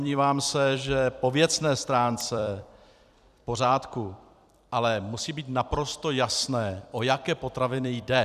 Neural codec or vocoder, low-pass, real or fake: vocoder, 44.1 kHz, 128 mel bands every 256 samples, BigVGAN v2; 14.4 kHz; fake